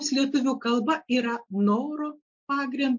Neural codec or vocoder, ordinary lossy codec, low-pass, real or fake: none; MP3, 48 kbps; 7.2 kHz; real